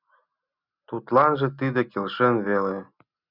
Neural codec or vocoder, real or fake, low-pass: none; real; 5.4 kHz